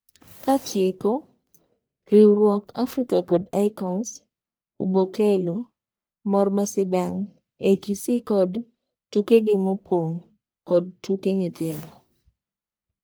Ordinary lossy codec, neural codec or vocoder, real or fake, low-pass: none; codec, 44.1 kHz, 1.7 kbps, Pupu-Codec; fake; none